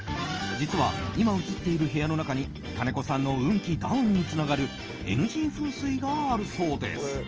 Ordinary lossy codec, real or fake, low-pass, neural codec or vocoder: Opus, 24 kbps; real; 7.2 kHz; none